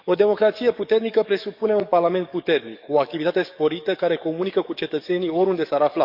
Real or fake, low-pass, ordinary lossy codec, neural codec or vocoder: fake; 5.4 kHz; none; codec, 44.1 kHz, 7.8 kbps, DAC